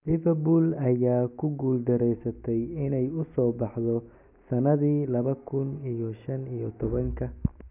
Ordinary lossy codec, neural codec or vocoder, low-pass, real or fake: none; none; 3.6 kHz; real